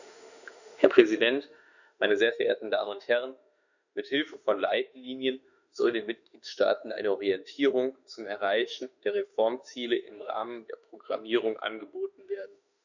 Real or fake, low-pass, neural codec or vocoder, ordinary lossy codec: fake; 7.2 kHz; autoencoder, 48 kHz, 32 numbers a frame, DAC-VAE, trained on Japanese speech; none